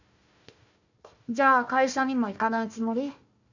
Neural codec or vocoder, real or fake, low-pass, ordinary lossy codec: codec, 16 kHz, 1 kbps, FunCodec, trained on Chinese and English, 50 frames a second; fake; 7.2 kHz; none